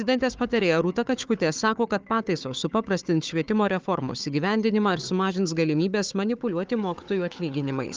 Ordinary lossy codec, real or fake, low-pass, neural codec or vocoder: Opus, 32 kbps; fake; 7.2 kHz; codec, 16 kHz, 4 kbps, FunCodec, trained on Chinese and English, 50 frames a second